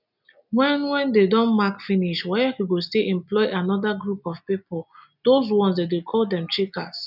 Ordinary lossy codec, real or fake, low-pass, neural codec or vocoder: none; real; 5.4 kHz; none